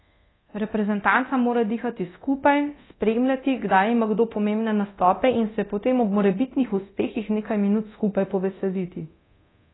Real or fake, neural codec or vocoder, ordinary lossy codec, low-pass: fake; codec, 24 kHz, 0.9 kbps, DualCodec; AAC, 16 kbps; 7.2 kHz